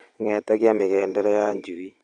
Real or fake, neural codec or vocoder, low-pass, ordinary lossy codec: fake; vocoder, 22.05 kHz, 80 mel bands, WaveNeXt; 9.9 kHz; none